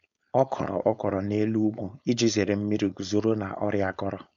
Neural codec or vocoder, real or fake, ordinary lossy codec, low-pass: codec, 16 kHz, 4.8 kbps, FACodec; fake; none; 7.2 kHz